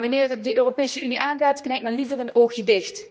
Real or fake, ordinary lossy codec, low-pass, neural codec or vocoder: fake; none; none; codec, 16 kHz, 1 kbps, X-Codec, HuBERT features, trained on general audio